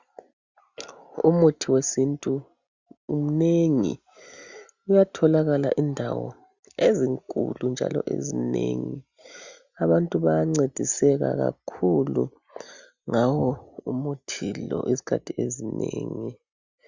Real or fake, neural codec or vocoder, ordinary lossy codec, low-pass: real; none; Opus, 64 kbps; 7.2 kHz